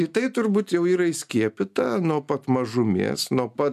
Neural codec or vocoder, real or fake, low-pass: none; real; 14.4 kHz